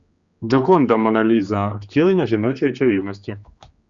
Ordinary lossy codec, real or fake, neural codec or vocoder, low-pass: Opus, 64 kbps; fake; codec, 16 kHz, 2 kbps, X-Codec, HuBERT features, trained on general audio; 7.2 kHz